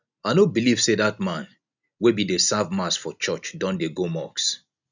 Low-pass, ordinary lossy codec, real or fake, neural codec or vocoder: 7.2 kHz; none; real; none